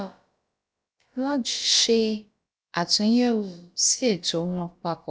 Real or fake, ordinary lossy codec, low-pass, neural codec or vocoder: fake; none; none; codec, 16 kHz, about 1 kbps, DyCAST, with the encoder's durations